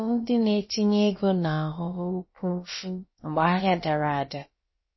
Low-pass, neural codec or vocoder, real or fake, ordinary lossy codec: 7.2 kHz; codec, 16 kHz, about 1 kbps, DyCAST, with the encoder's durations; fake; MP3, 24 kbps